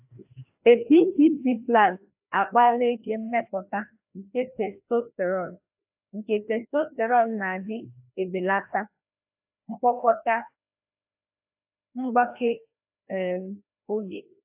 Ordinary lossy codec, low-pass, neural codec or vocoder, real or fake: AAC, 32 kbps; 3.6 kHz; codec, 16 kHz, 1 kbps, FreqCodec, larger model; fake